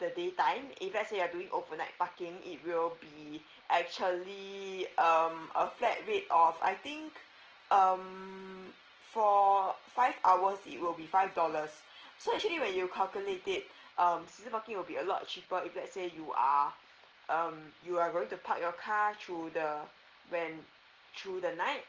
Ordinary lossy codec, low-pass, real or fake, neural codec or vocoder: Opus, 16 kbps; 7.2 kHz; real; none